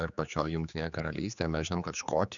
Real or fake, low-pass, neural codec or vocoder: fake; 7.2 kHz; codec, 16 kHz, 4 kbps, X-Codec, HuBERT features, trained on general audio